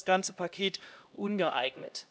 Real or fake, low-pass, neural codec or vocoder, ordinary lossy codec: fake; none; codec, 16 kHz, 1 kbps, X-Codec, HuBERT features, trained on LibriSpeech; none